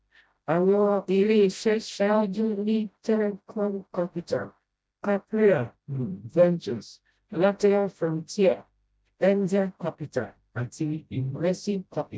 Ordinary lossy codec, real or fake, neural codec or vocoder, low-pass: none; fake; codec, 16 kHz, 0.5 kbps, FreqCodec, smaller model; none